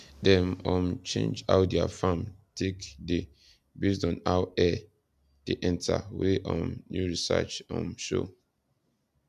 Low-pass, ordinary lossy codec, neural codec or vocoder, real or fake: 14.4 kHz; none; none; real